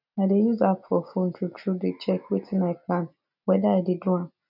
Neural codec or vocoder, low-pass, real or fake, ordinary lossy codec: none; 5.4 kHz; real; none